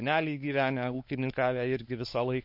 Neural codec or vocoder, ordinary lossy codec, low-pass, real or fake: codec, 16 kHz, 4 kbps, X-Codec, WavLM features, trained on Multilingual LibriSpeech; MP3, 32 kbps; 5.4 kHz; fake